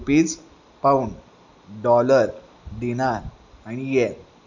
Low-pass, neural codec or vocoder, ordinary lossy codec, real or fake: 7.2 kHz; none; none; real